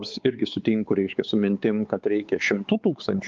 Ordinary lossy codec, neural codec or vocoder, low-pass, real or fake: Opus, 32 kbps; codec, 16 kHz, 4 kbps, X-Codec, WavLM features, trained on Multilingual LibriSpeech; 7.2 kHz; fake